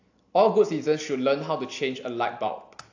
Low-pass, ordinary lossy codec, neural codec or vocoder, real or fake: 7.2 kHz; AAC, 48 kbps; none; real